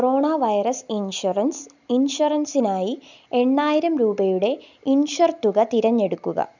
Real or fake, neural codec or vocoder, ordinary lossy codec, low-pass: real; none; none; 7.2 kHz